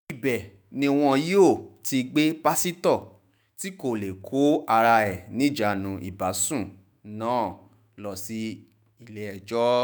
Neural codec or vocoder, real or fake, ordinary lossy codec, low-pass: autoencoder, 48 kHz, 128 numbers a frame, DAC-VAE, trained on Japanese speech; fake; none; none